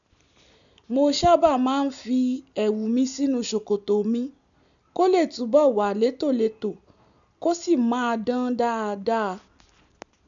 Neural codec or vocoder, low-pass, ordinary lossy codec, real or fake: none; 7.2 kHz; none; real